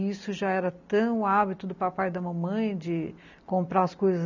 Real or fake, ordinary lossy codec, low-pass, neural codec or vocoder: real; none; 7.2 kHz; none